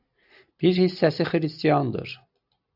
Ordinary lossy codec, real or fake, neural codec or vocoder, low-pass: AAC, 48 kbps; real; none; 5.4 kHz